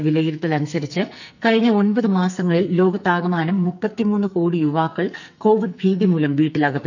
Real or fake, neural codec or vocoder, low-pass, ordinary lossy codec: fake; codec, 44.1 kHz, 2.6 kbps, SNAC; 7.2 kHz; none